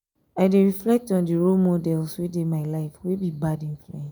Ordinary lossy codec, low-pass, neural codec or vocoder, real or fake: none; none; none; real